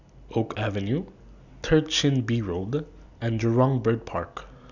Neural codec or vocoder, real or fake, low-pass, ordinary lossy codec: none; real; 7.2 kHz; none